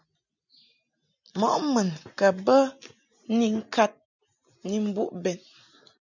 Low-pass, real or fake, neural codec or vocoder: 7.2 kHz; real; none